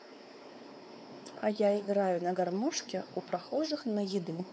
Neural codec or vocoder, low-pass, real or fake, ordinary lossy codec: codec, 16 kHz, 4 kbps, X-Codec, WavLM features, trained on Multilingual LibriSpeech; none; fake; none